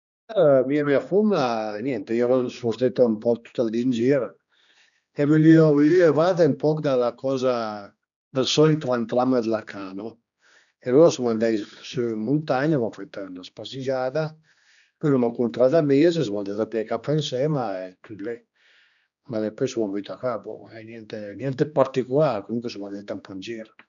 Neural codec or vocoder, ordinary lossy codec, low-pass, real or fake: codec, 16 kHz, 2 kbps, X-Codec, HuBERT features, trained on general audio; none; 7.2 kHz; fake